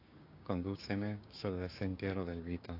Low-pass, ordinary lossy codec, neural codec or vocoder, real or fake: 5.4 kHz; AAC, 32 kbps; codec, 16 kHz, 6 kbps, DAC; fake